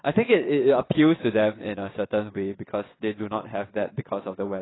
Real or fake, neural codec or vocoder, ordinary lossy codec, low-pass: fake; vocoder, 22.05 kHz, 80 mel bands, WaveNeXt; AAC, 16 kbps; 7.2 kHz